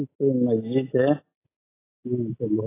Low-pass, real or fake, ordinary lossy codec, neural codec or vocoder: 3.6 kHz; real; AAC, 16 kbps; none